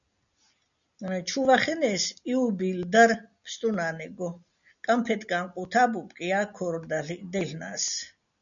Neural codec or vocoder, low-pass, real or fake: none; 7.2 kHz; real